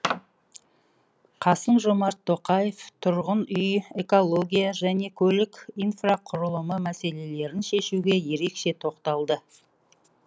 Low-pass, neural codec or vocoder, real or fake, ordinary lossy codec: none; none; real; none